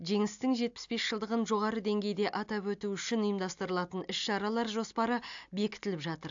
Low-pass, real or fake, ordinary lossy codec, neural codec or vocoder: 7.2 kHz; real; AAC, 64 kbps; none